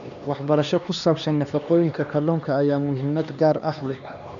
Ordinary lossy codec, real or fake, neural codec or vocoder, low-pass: none; fake; codec, 16 kHz, 2 kbps, X-Codec, HuBERT features, trained on LibriSpeech; 7.2 kHz